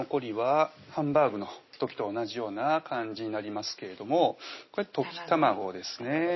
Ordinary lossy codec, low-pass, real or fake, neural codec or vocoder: MP3, 24 kbps; 7.2 kHz; real; none